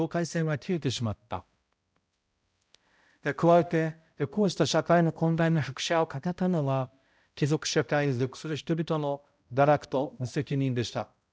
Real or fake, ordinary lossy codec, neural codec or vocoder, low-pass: fake; none; codec, 16 kHz, 0.5 kbps, X-Codec, HuBERT features, trained on balanced general audio; none